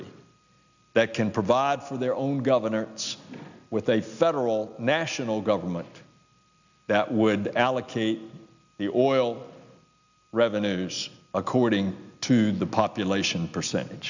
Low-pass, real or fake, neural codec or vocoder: 7.2 kHz; real; none